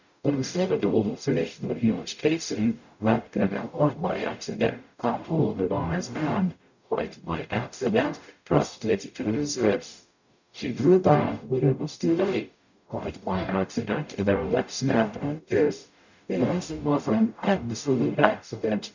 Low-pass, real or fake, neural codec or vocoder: 7.2 kHz; fake; codec, 44.1 kHz, 0.9 kbps, DAC